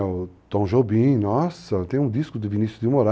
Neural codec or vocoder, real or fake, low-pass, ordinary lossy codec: none; real; none; none